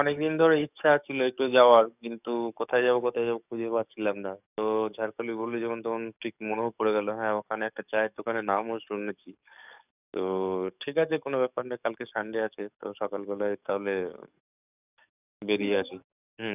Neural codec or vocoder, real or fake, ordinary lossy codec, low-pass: codec, 44.1 kHz, 7.8 kbps, DAC; fake; none; 3.6 kHz